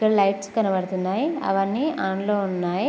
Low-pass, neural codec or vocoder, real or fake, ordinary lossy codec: none; none; real; none